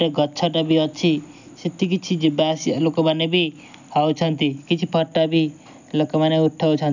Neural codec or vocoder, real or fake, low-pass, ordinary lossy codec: none; real; 7.2 kHz; none